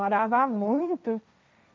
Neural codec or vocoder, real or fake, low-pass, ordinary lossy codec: codec, 16 kHz, 1.1 kbps, Voila-Tokenizer; fake; none; none